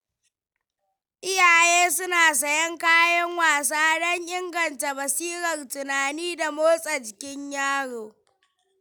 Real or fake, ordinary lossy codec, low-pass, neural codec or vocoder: real; none; none; none